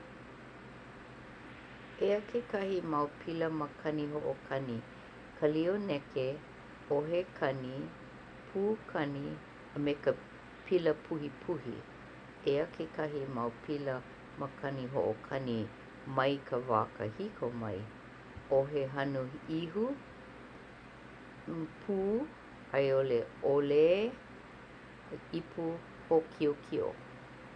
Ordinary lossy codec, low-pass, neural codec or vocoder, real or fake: none; 9.9 kHz; none; real